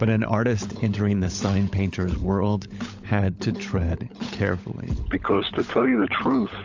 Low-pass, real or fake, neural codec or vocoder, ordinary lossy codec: 7.2 kHz; fake; codec, 16 kHz, 16 kbps, FunCodec, trained on LibriTTS, 50 frames a second; AAC, 48 kbps